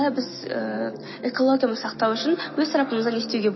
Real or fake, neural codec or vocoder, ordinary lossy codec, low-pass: real; none; MP3, 24 kbps; 7.2 kHz